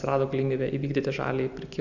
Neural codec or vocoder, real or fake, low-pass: none; real; 7.2 kHz